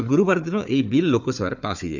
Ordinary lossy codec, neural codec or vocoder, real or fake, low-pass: none; codec, 16 kHz, 4 kbps, FunCodec, trained on Chinese and English, 50 frames a second; fake; 7.2 kHz